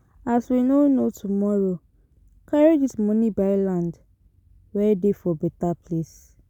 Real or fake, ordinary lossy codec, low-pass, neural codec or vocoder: real; none; 19.8 kHz; none